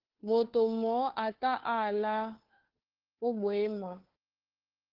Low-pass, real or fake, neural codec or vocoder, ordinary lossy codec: 5.4 kHz; fake; codec, 16 kHz, 2 kbps, FunCodec, trained on Chinese and English, 25 frames a second; Opus, 16 kbps